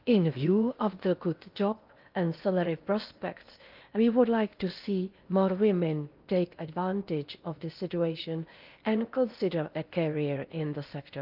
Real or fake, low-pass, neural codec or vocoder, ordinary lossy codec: fake; 5.4 kHz; codec, 16 kHz in and 24 kHz out, 0.8 kbps, FocalCodec, streaming, 65536 codes; Opus, 24 kbps